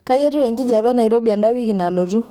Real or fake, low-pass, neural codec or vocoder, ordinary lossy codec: fake; 19.8 kHz; codec, 44.1 kHz, 2.6 kbps, DAC; none